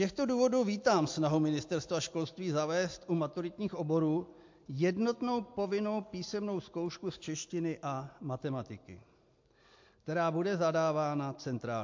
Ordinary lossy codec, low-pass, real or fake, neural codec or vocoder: MP3, 48 kbps; 7.2 kHz; real; none